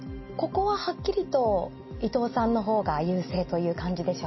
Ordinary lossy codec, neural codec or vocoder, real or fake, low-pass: MP3, 24 kbps; none; real; 7.2 kHz